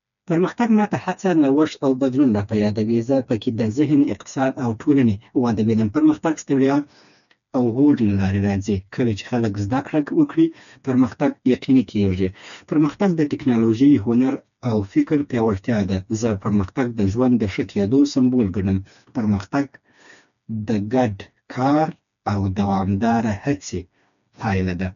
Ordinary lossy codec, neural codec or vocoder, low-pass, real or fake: none; codec, 16 kHz, 2 kbps, FreqCodec, smaller model; 7.2 kHz; fake